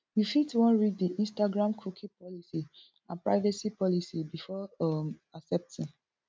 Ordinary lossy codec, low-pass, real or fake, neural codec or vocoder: none; none; real; none